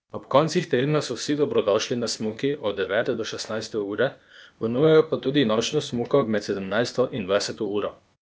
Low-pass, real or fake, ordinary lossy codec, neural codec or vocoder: none; fake; none; codec, 16 kHz, 0.8 kbps, ZipCodec